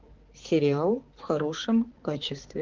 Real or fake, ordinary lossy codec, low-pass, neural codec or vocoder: fake; Opus, 16 kbps; 7.2 kHz; codec, 16 kHz, 4 kbps, X-Codec, HuBERT features, trained on balanced general audio